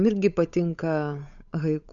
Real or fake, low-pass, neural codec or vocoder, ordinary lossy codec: fake; 7.2 kHz; codec, 16 kHz, 8 kbps, FunCodec, trained on Chinese and English, 25 frames a second; MP3, 96 kbps